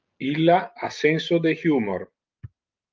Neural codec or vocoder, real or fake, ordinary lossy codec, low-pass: none; real; Opus, 32 kbps; 7.2 kHz